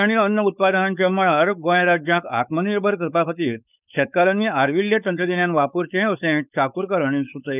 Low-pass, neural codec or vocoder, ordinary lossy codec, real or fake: 3.6 kHz; codec, 16 kHz, 4.8 kbps, FACodec; none; fake